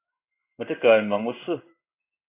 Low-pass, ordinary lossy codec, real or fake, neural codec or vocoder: 3.6 kHz; AAC, 24 kbps; fake; codec, 16 kHz in and 24 kHz out, 1 kbps, XY-Tokenizer